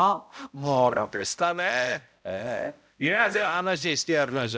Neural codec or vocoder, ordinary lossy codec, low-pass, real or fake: codec, 16 kHz, 0.5 kbps, X-Codec, HuBERT features, trained on balanced general audio; none; none; fake